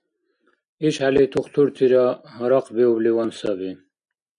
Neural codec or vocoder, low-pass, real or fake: none; 9.9 kHz; real